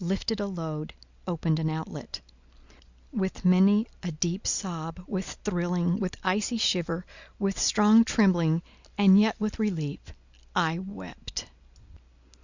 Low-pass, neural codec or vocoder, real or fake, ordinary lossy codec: 7.2 kHz; none; real; Opus, 64 kbps